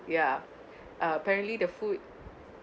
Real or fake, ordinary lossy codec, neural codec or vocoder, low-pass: real; none; none; none